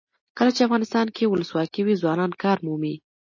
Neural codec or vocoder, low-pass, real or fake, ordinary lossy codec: none; 7.2 kHz; real; MP3, 32 kbps